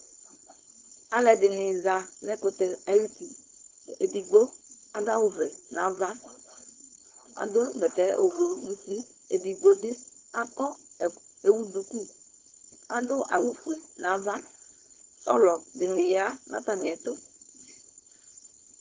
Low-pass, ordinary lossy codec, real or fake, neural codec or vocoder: 7.2 kHz; Opus, 16 kbps; fake; codec, 16 kHz, 4.8 kbps, FACodec